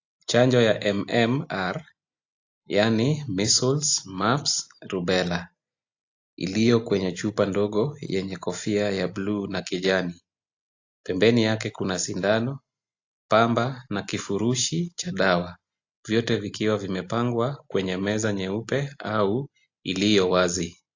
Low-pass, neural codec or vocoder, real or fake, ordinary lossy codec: 7.2 kHz; none; real; AAC, 48 kbps